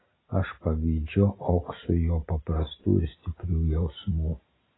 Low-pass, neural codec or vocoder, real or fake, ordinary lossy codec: 7.2 kHz; none; real; AAC, 16 kbps